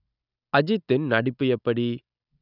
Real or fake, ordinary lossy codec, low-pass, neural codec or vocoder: real; none; 5.4 kHz; none